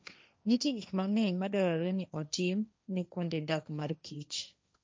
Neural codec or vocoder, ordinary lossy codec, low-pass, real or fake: codec, 16 kHz, 1.1 kbps, Voila-Tokenizer; none; none; fake